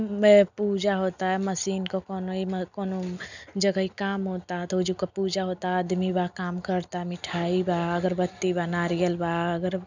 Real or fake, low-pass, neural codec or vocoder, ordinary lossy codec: real; 7.2 kHz; none; none